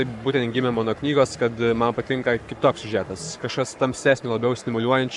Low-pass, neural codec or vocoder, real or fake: 10.8 kHz; codec, 44.1 kHz, 7.8 kbps, Pupu-Codec; fake